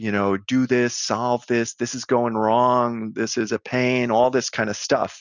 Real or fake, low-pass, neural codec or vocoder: real; 7.2 kHz; none